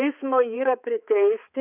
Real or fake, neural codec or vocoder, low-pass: fake; codec, 16 kHz, 4 kbps, X-Codec, HuBERT features, trained on balanced general audio; 3.6 kHz